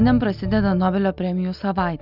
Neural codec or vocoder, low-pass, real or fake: none; 5.4 kHz; real